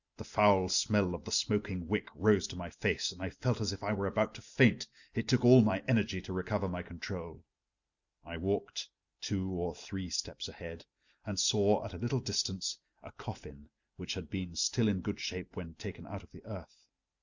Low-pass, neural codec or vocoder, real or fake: 7.2 kHz; none; real